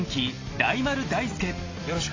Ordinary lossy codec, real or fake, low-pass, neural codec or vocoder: AAC, 32 kbps; real; 7.2 kHz; none